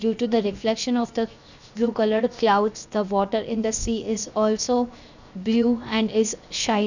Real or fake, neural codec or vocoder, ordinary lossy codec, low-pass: fake; codec, 16 kHz, 0.7 kbps, FocalCodec; none; 7.2 kHz